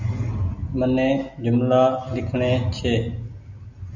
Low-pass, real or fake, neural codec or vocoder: 7.2 kHz; real; none